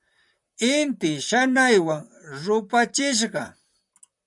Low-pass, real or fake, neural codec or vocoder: 10.8 kHz; fake; vocoder, 44.1 kHz, 128 mel bands, Pupu-Vocoder